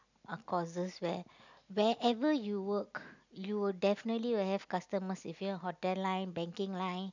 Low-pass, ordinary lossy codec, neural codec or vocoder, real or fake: 7.2 kHz; none; none; real